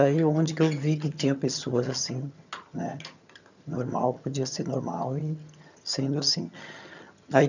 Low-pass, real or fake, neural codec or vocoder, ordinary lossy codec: 7.2 kHz; fake; vocoder, 22.05 kHz, 80 mel bands, HiFi-GAN; none